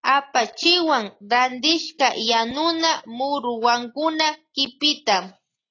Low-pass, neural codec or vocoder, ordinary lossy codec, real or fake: 7.2 kHz; none; AAC, 32 kbps; real